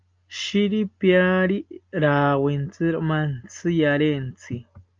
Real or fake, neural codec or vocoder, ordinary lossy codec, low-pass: real; none; Opus, 32 kbps; 7.2 kHz